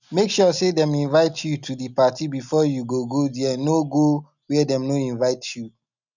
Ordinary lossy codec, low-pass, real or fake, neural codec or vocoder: none; 7.2 kHz; real; none